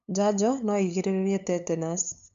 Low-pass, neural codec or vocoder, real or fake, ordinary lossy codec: 7.2 kHz; codec, 16 kHz, 8 kbps, FunCodec, trained on LibriTTS, 25 frames a second; fake; none